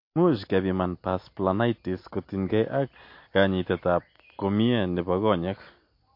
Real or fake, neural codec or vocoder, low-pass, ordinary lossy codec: real; none; 5.4 kHz; MP3, 32 kbps